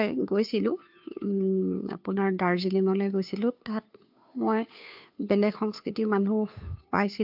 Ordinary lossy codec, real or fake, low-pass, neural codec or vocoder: AAC, 48 kbps; fake; 5.4 kHz; codec, 16 kHz, 2 kbps, FunCodec, trained on Chinese and English, 25 frames a second